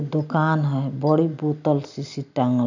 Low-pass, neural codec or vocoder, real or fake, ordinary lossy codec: 7.2 kHz; none; real; none